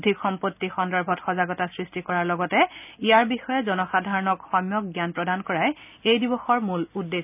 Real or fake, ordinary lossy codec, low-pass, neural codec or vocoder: real; none; 3.6 kHz; none